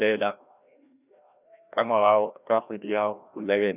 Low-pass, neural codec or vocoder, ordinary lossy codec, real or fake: 3.6 kHz; codec, 16 kHz, 1 kbps, FreqCodec, larger model; none; fake